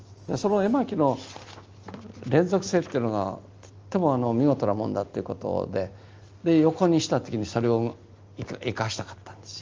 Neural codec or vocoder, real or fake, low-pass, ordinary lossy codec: none; real; 7.2 kHz; Opus, 24 kbps